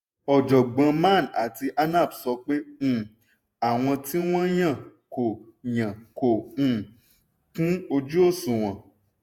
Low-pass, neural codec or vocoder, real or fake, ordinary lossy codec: 19.8 kHz; vocoder, 48 kHz, 128 mel bands, Vocos; fake; none